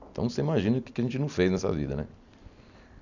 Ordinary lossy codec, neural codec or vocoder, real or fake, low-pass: none; none; real; 7.2 kHz